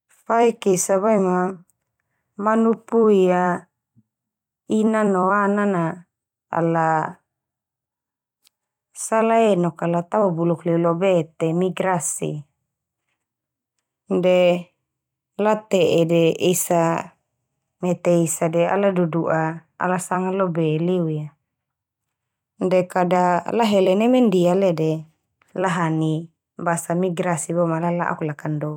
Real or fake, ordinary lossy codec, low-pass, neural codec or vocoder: fake; none; 19.8 kHz; vocoder, 44.1 kHz, 128 mel bands every 256 samples, BigVGAN v2